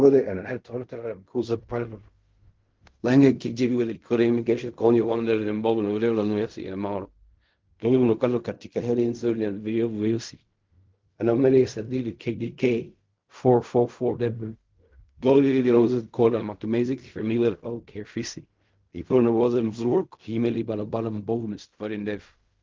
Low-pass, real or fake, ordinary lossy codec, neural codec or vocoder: 7.2 kHz; fake; Opus, 32 kbps; codec, 16 kHz in and 24 kHz out, 0.4 kbps, LongCat-Audio-Codec, fine tuned four codebook decoder